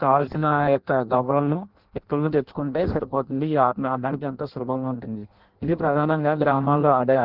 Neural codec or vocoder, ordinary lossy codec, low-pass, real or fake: codec, 16 kHz in and 24 kHz out, 0.6 kbps, FireRedTTS-2 codec; Opus, 16 kbps; 5.4 kHz; fake